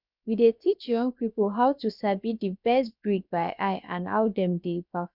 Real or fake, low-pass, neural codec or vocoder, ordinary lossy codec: fake; 5.4 kHz; codec, 16 kHz, about 1 kbps, DyCAST, with the encoder's durations; none